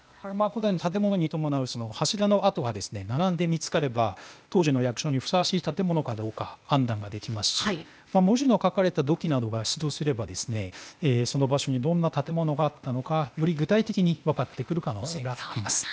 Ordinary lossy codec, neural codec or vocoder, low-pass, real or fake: none; codec, 16 kHz, 0.8 kbps, ZipCodec; none; fake